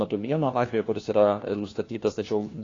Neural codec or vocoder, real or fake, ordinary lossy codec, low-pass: codec, 16 kHz, 1 kbps, FunCodec, trained on LibriTTS, 50 frames a second; fake; AAC, 32 kbps; 7.2 kHz